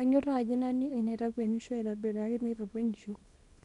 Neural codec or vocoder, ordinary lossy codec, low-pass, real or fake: codec, 24 kHz, 0.9 kbps, WavTokenizer, small release; none; 10.8 kHz; fake